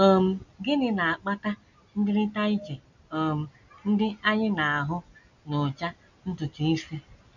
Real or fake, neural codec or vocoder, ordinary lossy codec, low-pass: real; none; none; 7.2 kHz